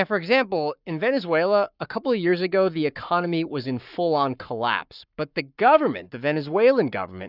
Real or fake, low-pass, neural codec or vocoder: fake; 5.4 kHz; codec, 44.1 kHz, 7.8 kbps, Pupu-Codec